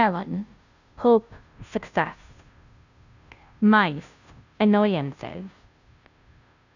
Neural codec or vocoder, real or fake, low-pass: codec, 16 kHz, 0.5 kbps, FunCodec, trained on Chinese and English, 25 frames a second; fake; 7.2 kHz